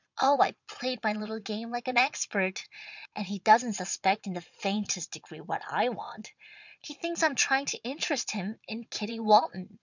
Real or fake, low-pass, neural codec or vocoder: fake; 7.2 kHz; vocoder, 22.05 kHz, 80 mel bands, Vocos